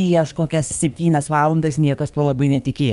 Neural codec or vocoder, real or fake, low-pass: codec, 24 kHz, 1 kbps, SNAC; fake; 10.8 kHz